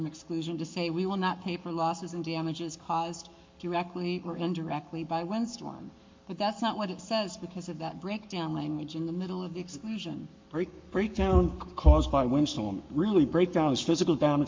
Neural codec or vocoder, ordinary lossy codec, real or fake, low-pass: codec, 44.1 kHz, 7.8 kbps, Pupu-Codec; MP3, 48 kbps; fake; 7.2 kHz